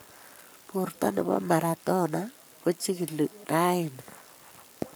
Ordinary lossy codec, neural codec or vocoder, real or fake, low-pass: none; codec, 44.1 kHz, 7.8 kbps, Pupu-Codec; fake; none